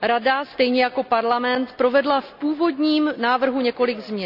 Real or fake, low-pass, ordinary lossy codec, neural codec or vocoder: real; 5.4 kHz; none; none